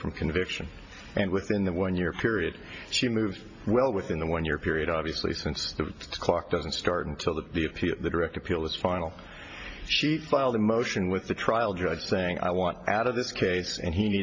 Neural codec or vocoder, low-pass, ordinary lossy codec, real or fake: none; 7.2 kHz; MP3, 32 kbps; real